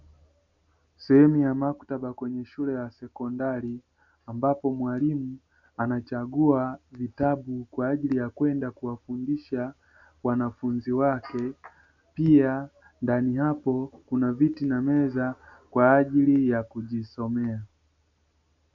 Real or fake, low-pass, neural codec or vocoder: real; 7.2 kHz; none